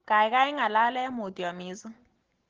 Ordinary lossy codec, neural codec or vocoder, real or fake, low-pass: Opus, 16 kbps; none; real; 7.2 kHz